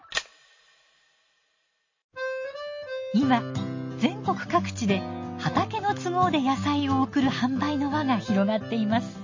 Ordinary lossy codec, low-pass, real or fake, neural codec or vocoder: MP3, 32 kbps; 7.2 kHz; real; none